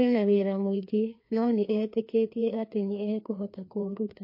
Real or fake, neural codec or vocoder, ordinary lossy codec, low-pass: fake; codec, 16 kHz, 2 kbps, FreqCodec, larger model; MP3, 32 kbps; 5.4 kHz